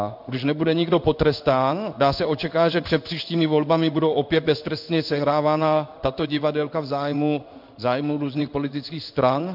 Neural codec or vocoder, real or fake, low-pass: codec, 16 kHz in and 24 kHz out, 1 kbps, XY-Tokenizer; fake; 5.4 kHz